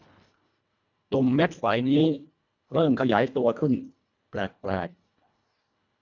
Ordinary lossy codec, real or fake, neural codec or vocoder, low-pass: none; fake; codec, 24 kHz, 1.5 kbps, HILCodec; 7.2 kHz